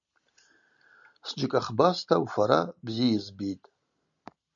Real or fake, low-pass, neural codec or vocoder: real; 7.2 kHz; none